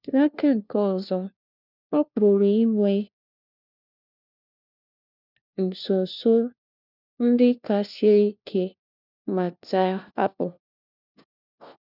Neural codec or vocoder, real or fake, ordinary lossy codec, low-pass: codec, 16 kHz, 1 kbps, FunCodec, trained on LibriTTS, 50 frames a second; fake; none; 5.4 kHz